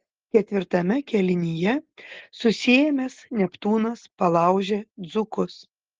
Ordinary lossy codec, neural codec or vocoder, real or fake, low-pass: Opus, 16 kbps; none; real; 7.2 kHz